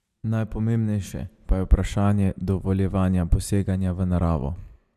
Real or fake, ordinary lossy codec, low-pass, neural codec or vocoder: fake; none; 14.4 kHz; vocoder, 44.1 kHz, 128 mel bands every 512 samples, BigVGAN v2